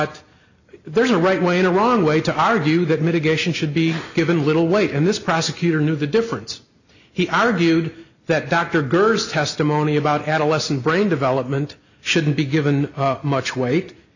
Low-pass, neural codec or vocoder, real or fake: 7.2 kHz; none; real